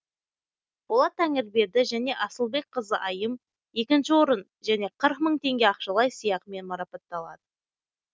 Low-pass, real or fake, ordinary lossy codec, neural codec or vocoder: none; real; none; none